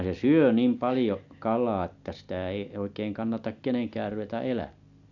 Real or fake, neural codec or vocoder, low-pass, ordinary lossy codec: real; none; 7.2 kHz; none